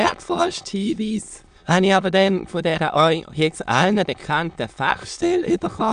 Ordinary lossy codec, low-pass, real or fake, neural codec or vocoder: AAC, 96 kbps; 9.9 kHz; fake; autoencoder, 22.05 kHz, a latent of 192 numbers a frame, VITS, trained on many speakers